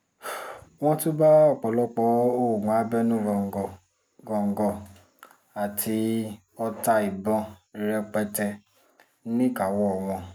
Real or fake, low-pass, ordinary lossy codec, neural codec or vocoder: real; none; none; none